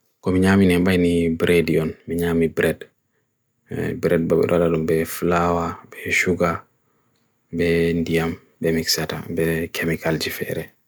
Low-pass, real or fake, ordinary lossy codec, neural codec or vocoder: none; real; none; none